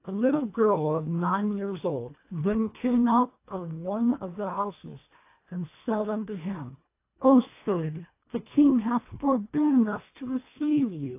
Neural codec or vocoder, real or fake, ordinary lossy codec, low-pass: codec, 24 kHz, 1.5 kbps, HILCodec; fake; AAC, 24 kbps; 3.6 kHz